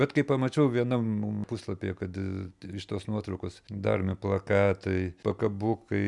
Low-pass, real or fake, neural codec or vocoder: 10.8 kHz; real; none